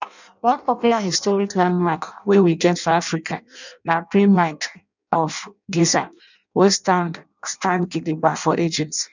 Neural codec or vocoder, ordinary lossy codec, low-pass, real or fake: codec, 16 kHz in and 24 kHz out, 0.6 kbps, FireRedTTS-2 codec; none; 7.2 kHz; fake